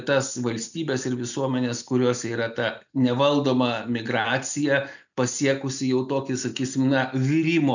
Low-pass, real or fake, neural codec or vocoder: 7.2 kHz; real; none